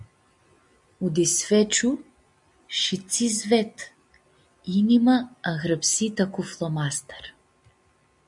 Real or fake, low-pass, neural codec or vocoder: real; 10.8 kHz; none